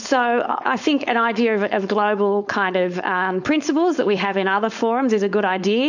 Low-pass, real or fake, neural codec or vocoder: 7.2 kHz; fake; codec, 16 kHz, 4.8 kbps, FACodec